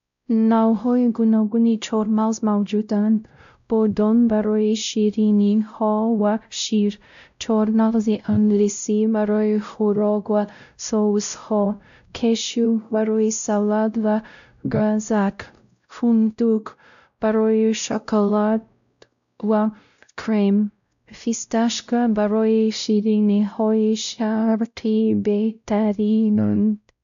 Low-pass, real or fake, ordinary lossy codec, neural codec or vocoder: 7.2 kHz; fake; AAC, 96 kbps; codec, 16 kHz, 0.5 kbps, X-Codec, WavLM features, trained on Multilingual LibriSpeech